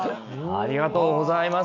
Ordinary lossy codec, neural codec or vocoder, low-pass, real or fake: none; none; 7.2 kHz; real